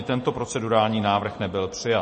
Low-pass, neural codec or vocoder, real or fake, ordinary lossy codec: 10.8 kHz; none; real; MP3, 32 kbps